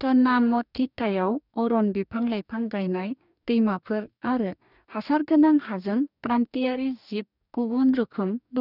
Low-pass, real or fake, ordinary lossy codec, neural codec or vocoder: 5.4 kHz; fake; none; codec, 44.1 kHz, 2.6 kbps, DAC